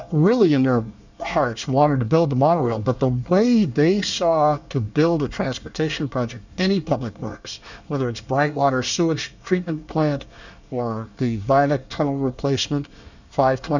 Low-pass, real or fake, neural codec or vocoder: 7.2 kHz; fake; codec, 24 kHz, 1 kbps, SNAC